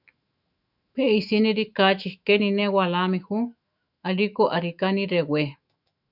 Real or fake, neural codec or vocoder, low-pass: fake; autoencoder, 48 kHz, 128 numbers a frame, DAC-VAE, trained on Japanese speech; 5.4 kHz